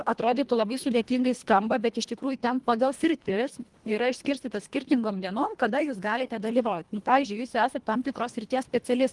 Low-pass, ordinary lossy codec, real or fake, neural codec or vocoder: 10.8 kHz; Opus, 24 kbps; fake; codec, 24 kHz, 1.5 kbps, HILCodec